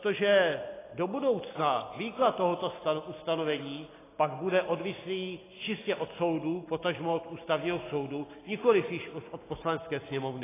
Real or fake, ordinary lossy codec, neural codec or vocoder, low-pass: real; AAC, 16 kbps; none; 3.6 kHz